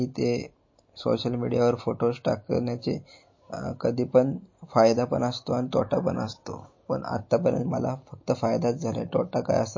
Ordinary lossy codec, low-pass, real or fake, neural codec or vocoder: MP3, 32 kbps; 7.2 kHz; real; none